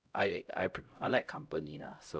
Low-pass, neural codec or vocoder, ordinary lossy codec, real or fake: none; codec, 16 kHz, 0.5 kbps, X-Codec, HuBERT features, trained on LibriSpeech; none; fake